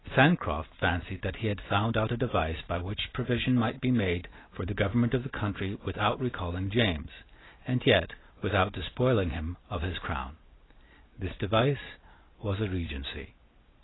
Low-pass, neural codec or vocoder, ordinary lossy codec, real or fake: 7.2 kHz; none; AAC, 16 kbps; real